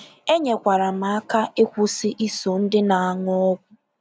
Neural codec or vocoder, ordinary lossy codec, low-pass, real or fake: none; none; none; real